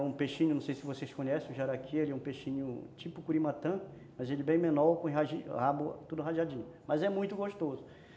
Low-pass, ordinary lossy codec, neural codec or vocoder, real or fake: none; none; none; real